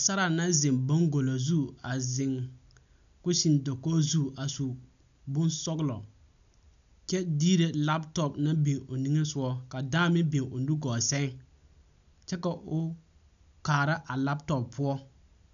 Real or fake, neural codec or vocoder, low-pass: real; none; 7.2 kHz